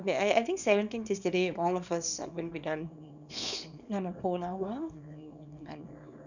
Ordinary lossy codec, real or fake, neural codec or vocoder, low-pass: none; fake; codec, 24 kHz, 0.9 kbps, WavTokenizer, small release; 7.2 kHz